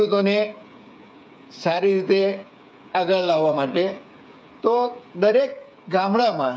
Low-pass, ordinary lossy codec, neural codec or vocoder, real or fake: none; none; codec, 16 kHz, 8 kbps, FreqCodec, smaller model; fake